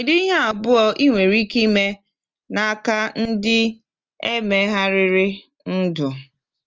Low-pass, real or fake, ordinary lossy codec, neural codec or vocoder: 7.2 kHz; real; Opus, 24 kbps; none